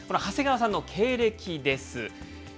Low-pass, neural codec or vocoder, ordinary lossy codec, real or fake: none; none; none; real